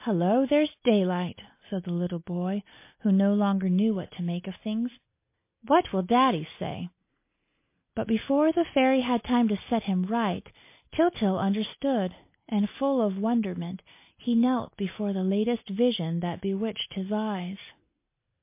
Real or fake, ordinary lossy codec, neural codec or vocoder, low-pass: real; MP3, 24 kbps; none; 3.6 kHz